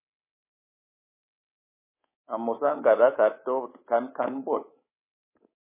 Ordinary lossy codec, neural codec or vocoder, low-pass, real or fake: MP3, 16 kbps; codec, 24 kHz, 3.1 kbps, DualCodec; 3.6 kHz; fake